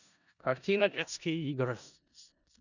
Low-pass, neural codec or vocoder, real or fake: 7.2 kHz; codec, 16 kHz in and 24 kHz out, 0.4 kbps, LongCat-Audio-Codec, four codebook decoder; fake